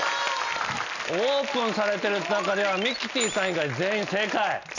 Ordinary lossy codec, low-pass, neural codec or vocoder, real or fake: none; 7.2 kHz; none; real